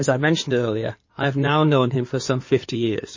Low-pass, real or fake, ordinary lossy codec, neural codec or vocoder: 7.2 kHz; fake; MP3, 32 kbps; codec, 16 kHz in and 24 kHz out, 2.2 kbps, FireRedTTS-2 codec